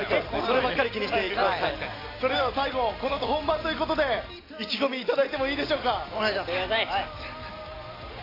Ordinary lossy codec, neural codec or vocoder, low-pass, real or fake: AAC, 24 kbps; none; 5.4 kHz; real